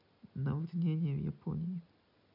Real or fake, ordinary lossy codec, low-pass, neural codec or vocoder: real; none; 5.4 kHz; none